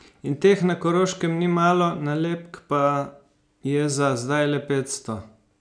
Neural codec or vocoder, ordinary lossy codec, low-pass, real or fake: none; none; 9.9 kHz; real